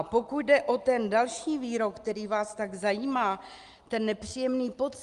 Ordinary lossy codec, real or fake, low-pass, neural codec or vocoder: Opus, 32 kbps; real; 10.8 kHz; none